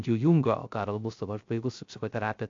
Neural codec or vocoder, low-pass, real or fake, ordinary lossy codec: codec, 16 kHz, 0.3 kbps, FocalCodec; 7.2 kHz; fake; AAC, 48 kbps